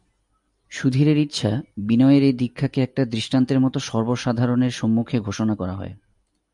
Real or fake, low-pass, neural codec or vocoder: real; 10.8 kHz; none